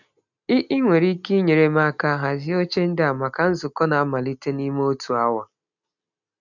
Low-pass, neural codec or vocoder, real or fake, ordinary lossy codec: 7.2 kHz; none; real; none